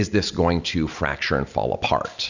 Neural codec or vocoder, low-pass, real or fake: none; 7.2 kHz; real